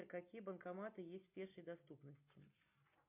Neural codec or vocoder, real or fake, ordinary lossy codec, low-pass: none; real; MP3, 32 kbps; 3.6 kHz